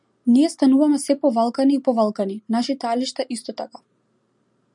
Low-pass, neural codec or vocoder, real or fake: 10.8 kHz; none; real